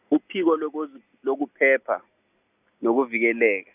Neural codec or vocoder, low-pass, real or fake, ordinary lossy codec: none; 3.6 kHz; real; none